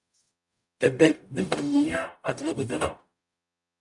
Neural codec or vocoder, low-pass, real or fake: codec, 44.1 kHz, 0.9 kbps, DAC; 10.8 kHz; fake